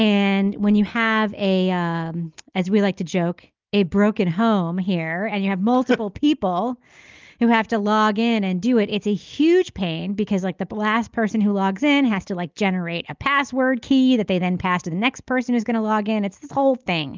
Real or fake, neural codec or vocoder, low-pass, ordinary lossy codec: real; none; 7.2 kHz; Opus, 24 kbps